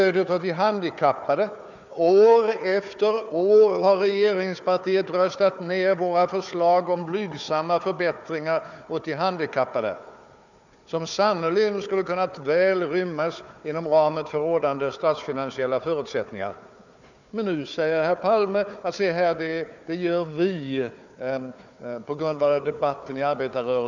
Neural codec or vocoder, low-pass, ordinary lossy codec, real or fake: codec, 16 kHz, 4 kbps, FunCodec, trained on Chinese and English, 50 frames a second; 7.2 kHz; none; fake